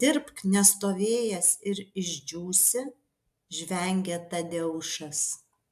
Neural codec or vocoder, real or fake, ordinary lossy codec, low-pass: none; real; AAC, 96 kbps; 14.4 kHz